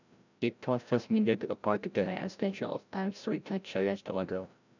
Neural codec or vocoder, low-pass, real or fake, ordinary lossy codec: codec, 16 kHz, 0.5 kbps, FreqCodec, larger model; 7.2 kHz; fake; none